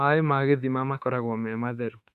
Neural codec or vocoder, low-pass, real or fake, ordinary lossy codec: autoencoder, 48 kHz, 32 numbers a frame, DAC-VAE, trained on Japanese speech; 14.4 kHz; fake; Opus, 64 kbps